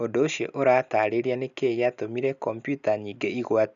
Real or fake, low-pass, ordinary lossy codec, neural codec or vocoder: real; 7.2 kHz; none; none